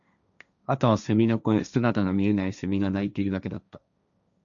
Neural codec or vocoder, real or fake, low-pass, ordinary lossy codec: codec, 16 kHz, 1.1 kbps, Voila-Tokenizer; fake; 7.2 kHz; MP3, 96 kbps